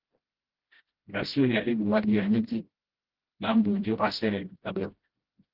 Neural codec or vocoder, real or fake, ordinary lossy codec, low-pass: codec, 16 kHz, 0.5 kbps, FreqCodec, smaller model; fake; Opus, 16 kbps; 5.4 kHz